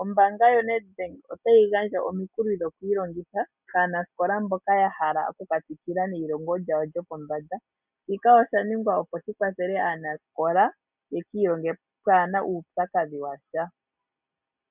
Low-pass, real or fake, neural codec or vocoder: 3.6 kHz; real; none